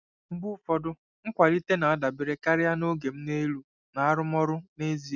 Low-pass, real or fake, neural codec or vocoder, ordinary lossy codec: 7.2 kHz; real; none; none